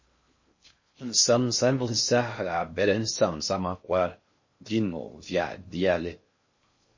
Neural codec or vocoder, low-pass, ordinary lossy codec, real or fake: codec, 16 kHz in and 24 kHz out, 0.6 kbps, FocalCodec, streaming, 4096 codes; 7.2 kHz; MP3, 32 kbps; fake